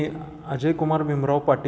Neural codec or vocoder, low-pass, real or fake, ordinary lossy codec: none; none; real; none